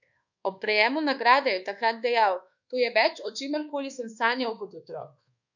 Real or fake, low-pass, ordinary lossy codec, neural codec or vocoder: fake; 7.2 kHz; none; codec, 24 kHz, 1.2 kbps, DualCodec